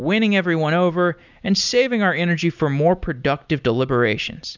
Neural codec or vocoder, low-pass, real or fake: none; 7.2 kHz; real